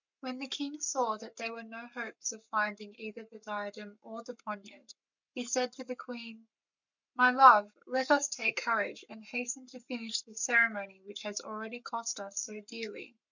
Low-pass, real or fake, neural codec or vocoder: 7.2 kHz; fake; codec, 44.1 kHz, 7.8 kbps, Pupu-Codec